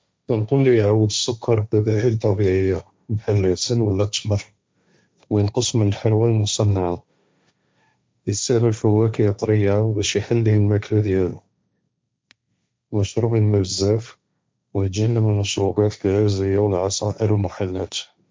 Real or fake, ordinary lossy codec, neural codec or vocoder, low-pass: fake; none; codec, 16 kHz, 1.1 kbps, Voila-Tokenizer; none